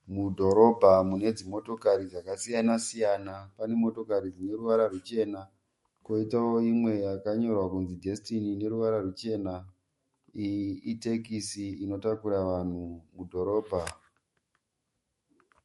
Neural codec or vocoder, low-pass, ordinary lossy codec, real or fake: autoencoder, 48 kHz, 128 numbers a frame, DAC-VAE, trained on Japanese speech; 19.8 kHz; MP3, 48 kbps; fake